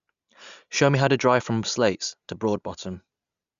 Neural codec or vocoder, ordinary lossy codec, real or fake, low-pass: none; Opus, 64 kbps; real; 7.2 kHz